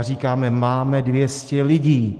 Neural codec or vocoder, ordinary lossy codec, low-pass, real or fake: none; Opus, 16 kbps; 9.9 kHz; real